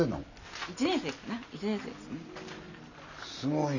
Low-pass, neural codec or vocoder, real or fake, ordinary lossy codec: 7.2 kHz; none; real; none